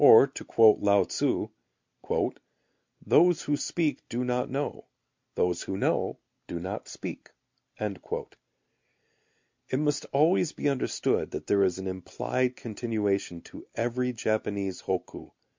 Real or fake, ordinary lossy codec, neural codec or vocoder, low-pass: real; MP3, 64 kbps; none; 7.2 kHz